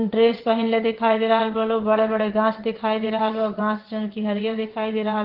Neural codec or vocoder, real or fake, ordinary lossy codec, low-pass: vocoder, 22.05 kHz, 80 mel bands, WaveNeXt; fake; Opus, 16 kbps; 5.4 kHz